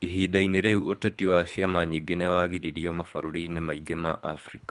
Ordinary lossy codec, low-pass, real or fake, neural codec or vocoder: none; 10.8 kHz; fake; codec, 24 kHz, 3 kbps, HILCodec